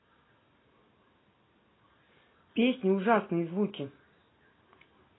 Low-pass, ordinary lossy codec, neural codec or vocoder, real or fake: 7.2 kHz; AAC, 16 kbps; none; real